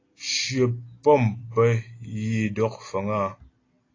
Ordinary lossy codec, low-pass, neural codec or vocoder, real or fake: AAC, 32 kbps; 7.2 kHz; none; real